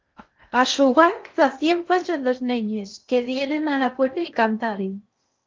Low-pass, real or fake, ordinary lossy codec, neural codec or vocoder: 7.2 kHz; fake; Opus, 32 kbps; codec, 16 kHz in and 24 kHz out, 0.6 kbps, FocalCodec, streaming, 2048 codes